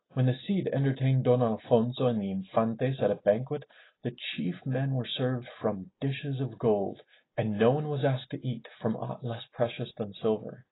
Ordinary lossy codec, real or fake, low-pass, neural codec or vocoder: AAC, 16 kbps; real; 7.2 kHz; none